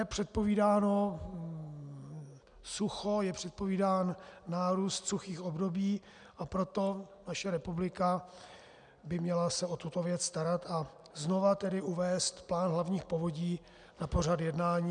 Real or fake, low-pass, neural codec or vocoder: real; 9.9 kHz; none